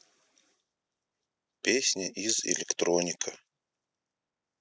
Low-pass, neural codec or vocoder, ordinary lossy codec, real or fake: none; none; none; real